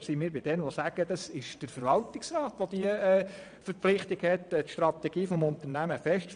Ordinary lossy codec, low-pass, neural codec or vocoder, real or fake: AAC, 96 kbps; 9.9 kHz; vocoder, 22.05 kHz, 80 mel bands, Vocos; fake